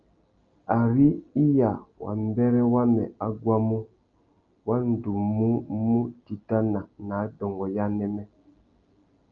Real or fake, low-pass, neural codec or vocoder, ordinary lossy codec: real; 7.2 kHz; none; Opus, 32 kbps